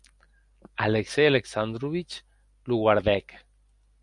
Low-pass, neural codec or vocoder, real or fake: 10.8 kHz; none; real